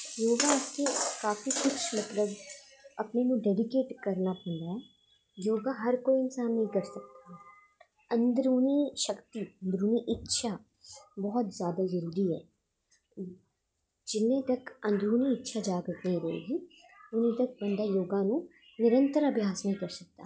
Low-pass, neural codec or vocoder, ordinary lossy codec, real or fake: none; none; none; real